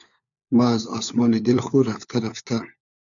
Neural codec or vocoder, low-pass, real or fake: codec, 16 kHz, 4 kbps, FunCodec, trained on LibriTTS, 50 frames a second; 7.2 kHz; fake